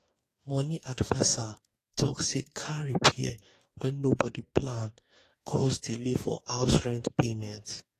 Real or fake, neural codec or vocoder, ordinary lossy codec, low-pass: fake; codec, 44.1 kHz, 2.6 kbps, DAC; AAC, 48 kbps; 14.4 kHz